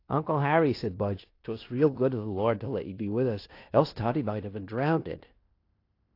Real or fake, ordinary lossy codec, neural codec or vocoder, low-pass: fake; AAC, 32 kbps; codec, 16 kHz in and 24 kHz out, 0.9 kbps, LongCat-Audio-Codec, fine tuned four codebook decoder; 5.4 kHz